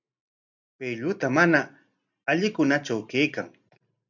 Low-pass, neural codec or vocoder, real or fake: 7.2 kHz; none; real